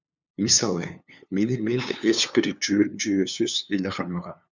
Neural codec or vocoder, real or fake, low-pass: codec, 16 kHz, 2 kbps, FunCodec, trained on LibriTTS, 25 frames a second; fake; 7.2 kHz